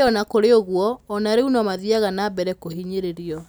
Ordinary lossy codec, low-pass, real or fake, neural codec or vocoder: none; none; real; none